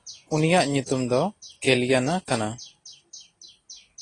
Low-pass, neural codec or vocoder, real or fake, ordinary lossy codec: 10.8 kHz; none; real; AAC, 32 kbps